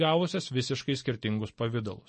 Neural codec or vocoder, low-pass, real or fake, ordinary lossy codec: none; 10.8 kHz; real; MP3, 32 kbps